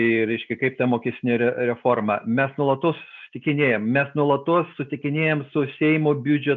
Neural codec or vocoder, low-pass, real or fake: none; 7.2 kHz; real